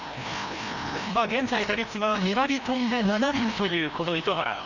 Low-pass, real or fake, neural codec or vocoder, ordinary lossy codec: 7.2 kHz; fake; codec, 16 kHz, 1 kbps, FreqCodec, larger model; none